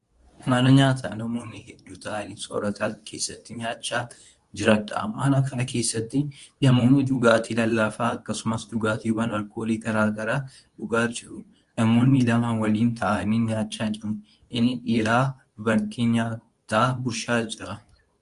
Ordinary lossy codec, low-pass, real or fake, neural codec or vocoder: Opus, 64 kbps; 10.8 kHz; fake; codec, 24 kHz, 0.9 kbps, WavTokenizer, medium speech release version 2